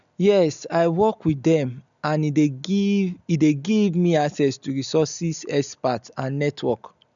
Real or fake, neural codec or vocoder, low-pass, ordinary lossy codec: real; none; 7.2 kHz; none